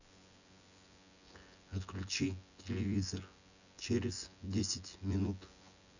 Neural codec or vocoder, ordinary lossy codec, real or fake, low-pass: vocoder, 24 kHz, 100 mel bands, Vocos; none; fake; 7.2 kHz